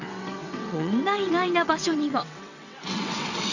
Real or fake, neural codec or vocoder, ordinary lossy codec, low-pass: fake; vocoder, 22.05 kHz, 80 mel bands, WaveNeXt; none; 7.2 kHz